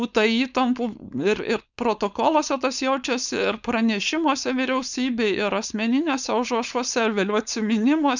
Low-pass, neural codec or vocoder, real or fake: 7.2 kHz; codec, 16 kHz, 4.8 kbps, FACodec; fake